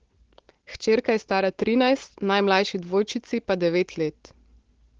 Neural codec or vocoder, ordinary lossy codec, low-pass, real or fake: none; Opus, 16 kbps; 7.2 kHz; real